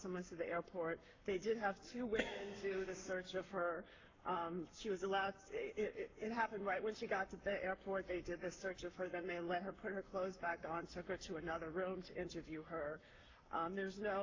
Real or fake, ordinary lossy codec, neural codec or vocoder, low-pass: fake; AAC, 48 kbps; codec, 44.1 kHz, 7.8 kbps, Pupu-Codec; 7.2 kHz